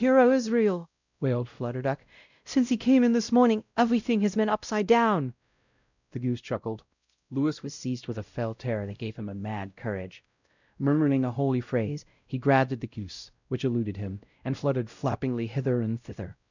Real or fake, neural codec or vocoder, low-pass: fake; codec, 16 kHz, 0.5 kbps, X-Codec, WavLM features, trained on Multilingual LibriSpeech; 7.2 kHz